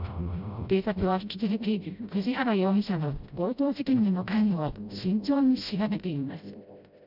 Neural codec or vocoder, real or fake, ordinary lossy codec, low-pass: codec, 16 kHz, 0.5 kbps, FreqCodec, smaller model; fake; none; 5.4 kHz